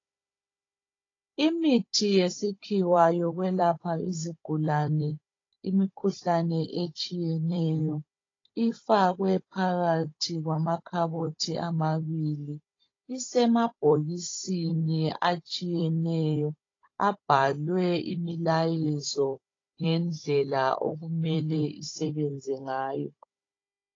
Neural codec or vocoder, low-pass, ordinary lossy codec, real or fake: codec, 16 kHz, 16 kbps, FunCodec, trained on Chinese and English, 50 frames a second; 7.2 kHz; AAC, 32 kbps; fake